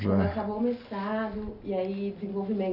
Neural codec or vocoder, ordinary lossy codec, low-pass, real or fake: none; AAC, 48 kbps; 5.4 kHz; real